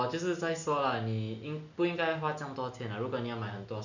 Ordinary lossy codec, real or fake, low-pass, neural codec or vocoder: none; real; 7.2 kHz; none